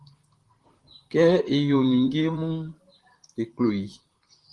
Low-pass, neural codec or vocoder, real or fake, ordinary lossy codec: 10.8 kHz; vocoder, 44.1 kHz, 128 mel bands, Pupu-Vocoder; fake; Opus, 24 kbps